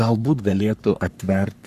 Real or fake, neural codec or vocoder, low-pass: fake; codec, 44.1 kHz, 3.4 kbps, Pupu-Codec; 14.4 kHz